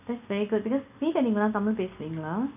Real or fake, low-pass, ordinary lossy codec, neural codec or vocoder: real; 3.6 kHz; none; none